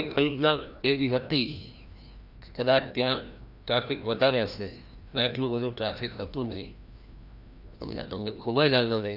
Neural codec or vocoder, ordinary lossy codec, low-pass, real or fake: codec, 16 kHz, 1 kbps, FreqCodec, larger model; none; 5.4 kHz; fake